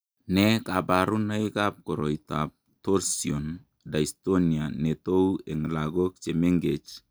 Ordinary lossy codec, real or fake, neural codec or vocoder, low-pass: none; real; none; none